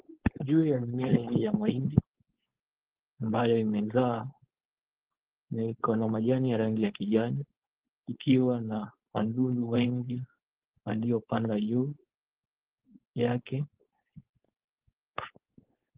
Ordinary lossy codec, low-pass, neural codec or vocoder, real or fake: Opus, 16 kbps; 3.6 kHz; codec, 16 kHz, 4.8 kbps, FACodec; fake